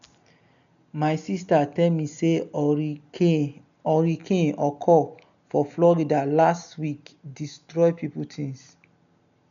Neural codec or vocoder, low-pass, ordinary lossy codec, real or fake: none; 7.2 kHz; none; real